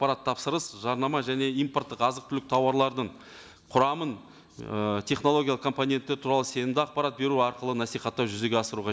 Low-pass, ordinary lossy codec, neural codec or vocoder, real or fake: none; none; none; real